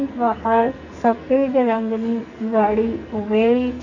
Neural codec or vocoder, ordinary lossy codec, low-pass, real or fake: codec, 44.1 kHz, 2.6 kbps, SNAC; none; 7.2 kHz; fake